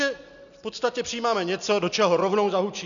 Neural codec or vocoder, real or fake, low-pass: none; real; 7.2 kHz